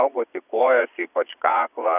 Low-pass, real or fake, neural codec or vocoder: 3.6 kHz; fake; vocoder, 22.05 kHz, 80 mel bands, Vocos